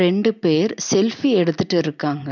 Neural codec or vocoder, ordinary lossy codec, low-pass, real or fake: none; none; 7.2 kHz; real